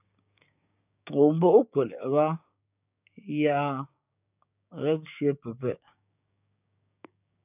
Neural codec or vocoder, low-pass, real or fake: codec, 16 kHz, 6 kbps, DAC; 3.6 kHz; fake